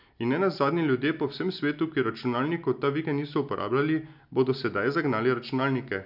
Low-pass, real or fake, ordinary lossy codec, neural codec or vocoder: 5.4 kHz; real; none; none